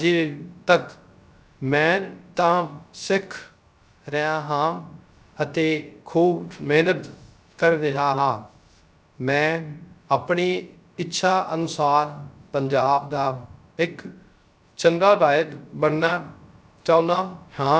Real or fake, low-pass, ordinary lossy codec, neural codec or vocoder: fake; none; none; codec, 16 kHz, 0.3 kbps, FocalCodec